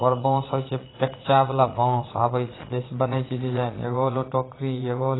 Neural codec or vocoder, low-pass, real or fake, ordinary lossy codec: vocoder, 22.05 kHz, 80 mel bands, Vocos; 7.2 kHz; fake; AAC, 16 kbps